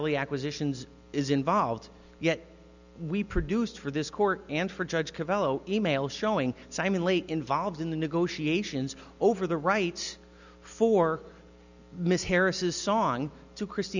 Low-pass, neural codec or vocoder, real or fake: 7.2 kHz; none; real